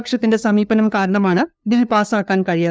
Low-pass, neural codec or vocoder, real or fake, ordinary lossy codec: none; codec, 16 kHz, 2 kbps, FunCodec, trained on LibriTTS, 25 frames a second; fake; none